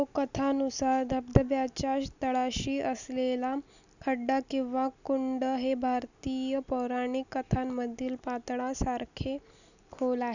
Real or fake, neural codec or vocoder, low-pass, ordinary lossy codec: real; none; 7.2 kHz; none